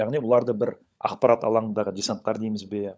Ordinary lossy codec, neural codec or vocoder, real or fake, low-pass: none; codec, 16 kHz, 16 kbps, FunCodec, trained on LibriTTS, 50 frames a second; fake; none